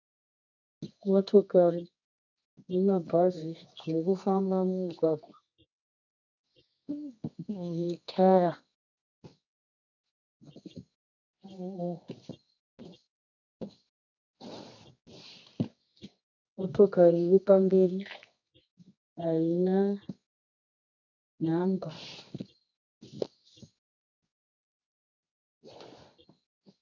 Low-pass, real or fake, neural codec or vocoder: 7.2 kHz; fake; codec, 24 kHz, 0.9 kbps, WavTokenizer, medium music audio release